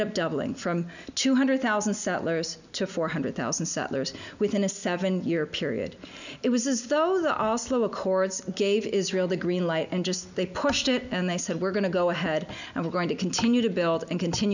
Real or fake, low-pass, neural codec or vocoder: real; 7.2 kHz; none